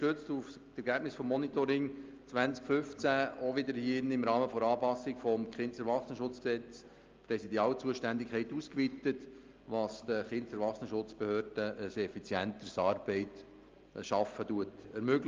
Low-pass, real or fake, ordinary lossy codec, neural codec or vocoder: 7.2 kHz; real; Opus, 24 kbps; none